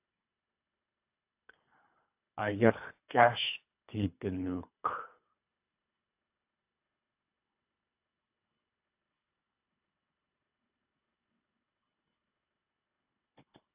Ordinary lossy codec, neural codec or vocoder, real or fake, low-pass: AAC, 24 kbps; codec, 24 kHz, 3 kbps, HILCodec; fake; 3.6 kHz